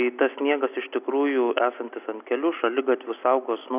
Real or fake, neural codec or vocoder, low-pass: real; none; 3.6 kHz